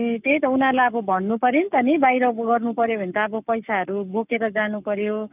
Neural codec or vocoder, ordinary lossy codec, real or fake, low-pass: codec, 44.1 kHz, 7.8 kbps, Pupu-Codec; none; fake; 3.6 kHz